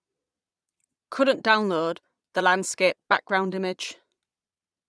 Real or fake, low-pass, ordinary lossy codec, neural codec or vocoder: fake; none; none; vocoder, 22.05 kHz, 80 mel bands, Vocos